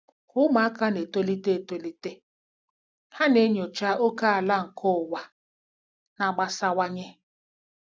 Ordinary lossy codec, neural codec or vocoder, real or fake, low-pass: none; none; real; none